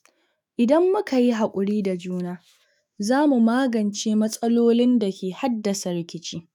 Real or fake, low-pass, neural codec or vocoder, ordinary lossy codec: fake; 19.8 kHz; autoencoder, 48 kHz, 128 numbers a frame, DAC-VAE, trained on Japanese speech; none